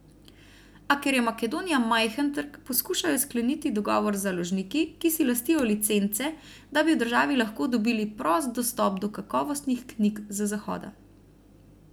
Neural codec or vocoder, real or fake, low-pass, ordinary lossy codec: none; real; none; none